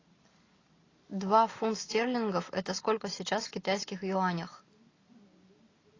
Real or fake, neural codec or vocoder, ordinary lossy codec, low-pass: real; none; AAC, 32 kbps; 7.2 kHz